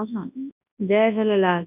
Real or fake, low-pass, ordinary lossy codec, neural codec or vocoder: fake; 3.6 kHz; none; codec, 24 kHz, 0.9 kbps, WavTokenizer, large speech release